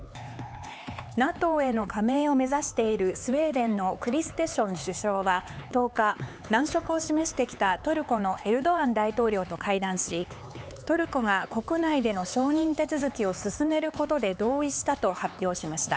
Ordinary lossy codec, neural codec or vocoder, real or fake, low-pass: none; codec, 16 kHz, 4 kbps, X-Codec, HuBERT features, trained on LibriSpeech; fake; none